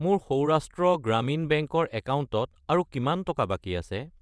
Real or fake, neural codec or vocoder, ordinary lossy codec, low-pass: fake; vocoder, 22.05 kHz, 80 mel bands, WaveNeXt; none; none